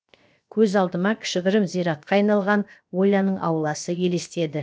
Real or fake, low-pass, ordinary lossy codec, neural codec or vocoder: fake; none; none; codec, 16 kHz, 0.7 kbps, FocalCodec